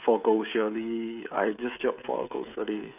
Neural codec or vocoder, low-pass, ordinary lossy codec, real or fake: codec, 16 kHz, 16 kbps, FreqCodec, smaller model; 3.6 kHz; none; fake